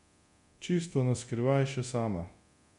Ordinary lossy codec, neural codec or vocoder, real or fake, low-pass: none; codec, 24 kHz, 0.9 kbps, DualCodec; fake; 10.8 kHz